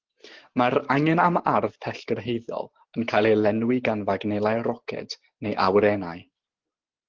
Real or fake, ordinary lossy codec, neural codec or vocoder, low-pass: real; Opus, 16 kbps; none; 7.2 kHz